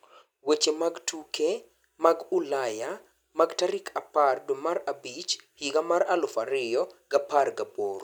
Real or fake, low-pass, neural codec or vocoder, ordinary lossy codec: real; none; none; none